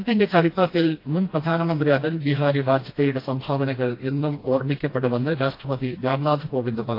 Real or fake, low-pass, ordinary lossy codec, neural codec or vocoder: fake; 5.4 kHz; AAC, 32 kbps; codec, 16 kHz, 1 kbps, FreqCodec, smaller model